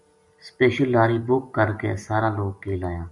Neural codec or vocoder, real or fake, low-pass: none; real; 10.8 kHz